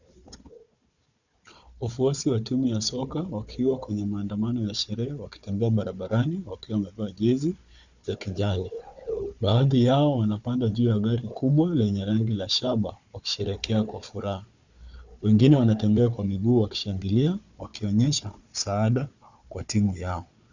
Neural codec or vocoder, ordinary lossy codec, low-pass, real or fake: codec, 16 kHz, 4 kbps, FunCodec, trained on Chinese and English, 50 frames a second; Opus, 64 kbps; 7.2 kHz; fake